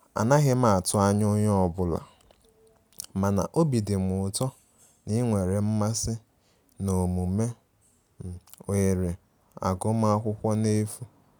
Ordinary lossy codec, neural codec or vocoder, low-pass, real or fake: none; none; none; real